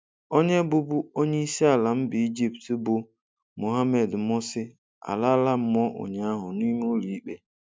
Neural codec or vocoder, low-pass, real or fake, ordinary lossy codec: none; none; real; none